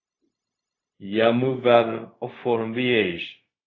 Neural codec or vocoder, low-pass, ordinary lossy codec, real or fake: codec, 16 kHz, 0.4 kbps, LongCat-Audio-Codec; 7.2 kHz; AAC, 32 kbps; fake